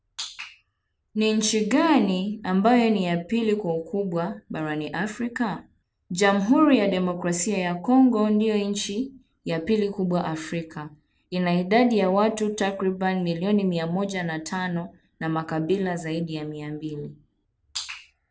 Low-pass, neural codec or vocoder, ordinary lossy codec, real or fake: none; none; none; real